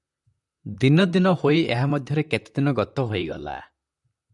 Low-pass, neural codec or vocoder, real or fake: 10.8 kHz; vocoder, 44.1 kHz, 128 mel bands, Pupu-Vocoder; fake